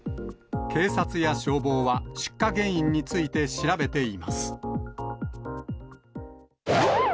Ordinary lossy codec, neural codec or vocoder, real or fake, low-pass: none; none; real; none